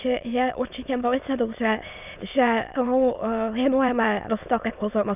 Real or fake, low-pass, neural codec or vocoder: fake; 3.6 kHz; autoencoder, 22.05 kHz, a latent of 192 numbers a frame, VITS, trained on many speakers